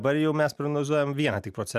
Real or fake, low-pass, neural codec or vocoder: real; 14.4 kHz; none